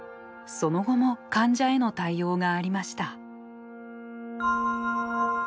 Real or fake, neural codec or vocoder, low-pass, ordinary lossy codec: real; none; none; none